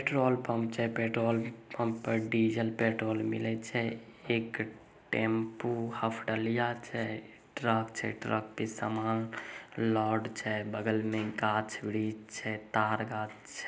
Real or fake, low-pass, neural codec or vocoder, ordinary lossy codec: real; none; none; none